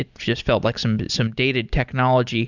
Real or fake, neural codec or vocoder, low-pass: real; none; 7.2 kHz